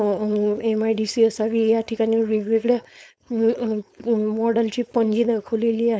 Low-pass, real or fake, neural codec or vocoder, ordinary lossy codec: none; fake; codec, 16 kHz, 4.8 kbps, FACodec; none